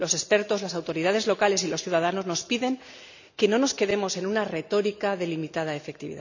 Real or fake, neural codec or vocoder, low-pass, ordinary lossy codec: real; none; 7.2 kHz; none